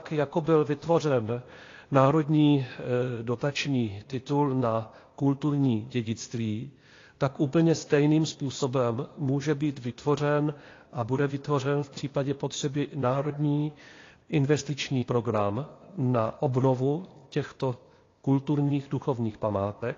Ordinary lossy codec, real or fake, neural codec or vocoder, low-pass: AAC, 32 kbps; fake; codec, 16 kHz, 0.8 kbps, ZipCodec; 7.2 kHz